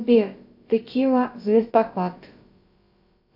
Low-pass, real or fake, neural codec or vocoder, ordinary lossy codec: 5.4 kHz; fake; codec, 16 kHz, about 1 kbps, DyCAST, with the encoder's durations; AAC, 48 kbps